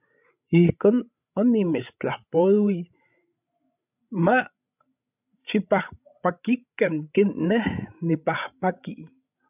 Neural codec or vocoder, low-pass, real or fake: codec, 16 kHz, 16 kbps, FreqCodec, larger model; 3.6 kHz; fake